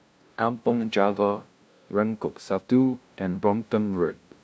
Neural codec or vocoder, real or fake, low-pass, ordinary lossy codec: codec, 16 kHz, 0.5 kbps, FunCodec, trained on LibriTTS, 25 frames a second; fake; none; none